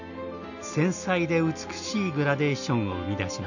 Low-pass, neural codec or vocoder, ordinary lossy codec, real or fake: 7.2 kHz; none; none; real